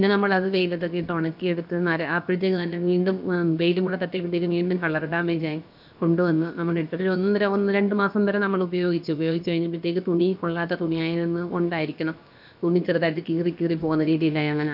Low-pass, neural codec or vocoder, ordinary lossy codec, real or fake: 5.4 kHz; codec, 16 kHz, about 1 kbps, DyCAST, with the encoder's durations; none; fake